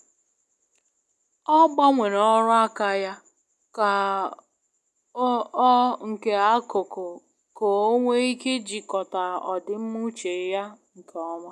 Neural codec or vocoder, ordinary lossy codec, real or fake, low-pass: none; none; real; none